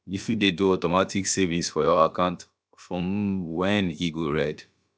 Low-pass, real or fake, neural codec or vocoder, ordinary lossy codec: none; fake; codec, 16 kHz, about 1 kbps, DyCAST, with the encoder's durations; none